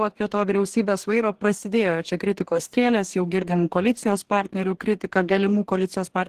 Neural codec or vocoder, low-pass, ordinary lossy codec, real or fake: codec, 44.1 kHz, 2.6 kbps, DAC; 14.4 kHz; Opus, 16 kbps; fake